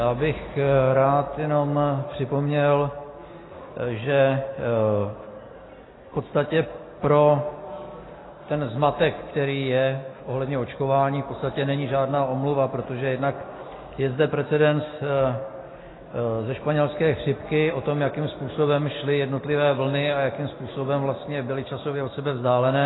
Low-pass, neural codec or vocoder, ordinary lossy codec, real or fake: 7.2 kHz; none; AAC, 16 kbps; real